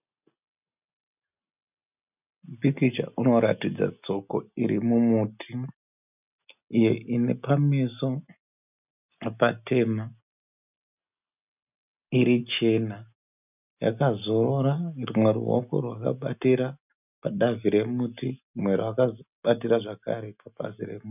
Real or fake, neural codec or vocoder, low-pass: real; none; 3.6 kHz